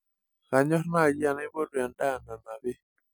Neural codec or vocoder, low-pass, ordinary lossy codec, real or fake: none; none; none; real